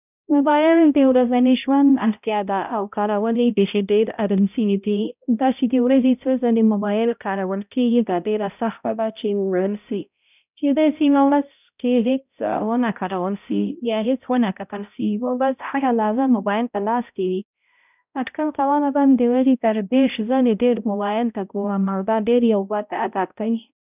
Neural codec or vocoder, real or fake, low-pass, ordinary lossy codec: codec, 16 kHz, 0.5 kbps, X-Codec, HuBERT features, trained on balanced general audio; fake; 3.6 kHz; none